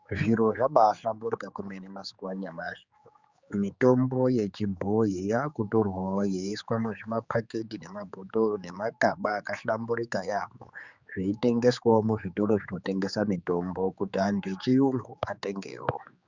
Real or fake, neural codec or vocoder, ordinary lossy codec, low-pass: fake; codec, 16 kHz, 4 kbps, X-Codec, HuBERT features, trained on general audio; Opus, 64 kbps; 7.2 kHz